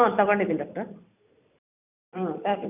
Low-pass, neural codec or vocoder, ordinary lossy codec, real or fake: 3.6 kHz; vocoder, 44.1 kHz, 128 mel bands every 256 samples, BigVGAN v2; none; fake